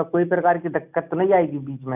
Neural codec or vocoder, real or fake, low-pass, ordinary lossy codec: none; real; 3.6 kHz; none